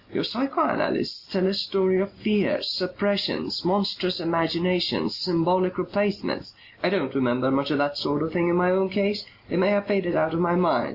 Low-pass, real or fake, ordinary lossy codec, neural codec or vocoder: 5.4 kHz; real; Opus, 64 kbps; none